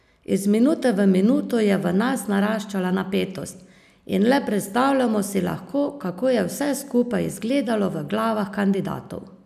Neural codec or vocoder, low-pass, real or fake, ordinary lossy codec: none; 14.4 kHz; real; none